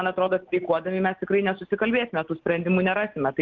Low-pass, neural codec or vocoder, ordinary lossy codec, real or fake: 7.2 kHz; none; Opus, 16 kbps; real